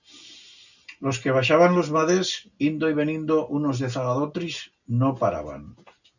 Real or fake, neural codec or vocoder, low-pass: real; none; 7.2 kHz